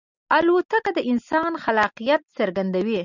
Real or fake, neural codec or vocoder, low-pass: real; none; 7.2 kHz